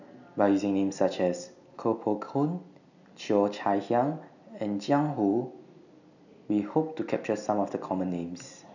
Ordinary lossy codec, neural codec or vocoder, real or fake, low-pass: none; none; real; 7.2 kHz